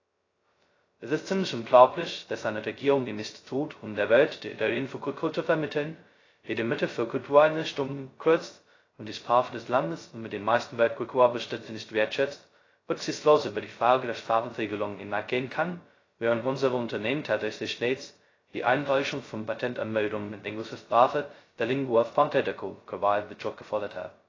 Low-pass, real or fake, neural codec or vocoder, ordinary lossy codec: 7.2 kHz; fake; codec, 16 kHz, 0.2 kbps, FocalCodec; AAC, 32 kbps